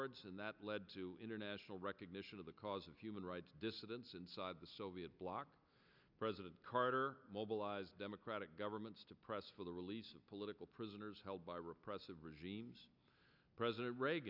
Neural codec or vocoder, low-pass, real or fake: none; 5.4 kHz; real